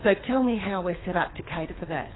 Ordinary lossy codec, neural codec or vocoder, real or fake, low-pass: AAC, 16 kbps; codec, 24 kHz, 3 kbps, HILCodec; fake; 7.2 kHz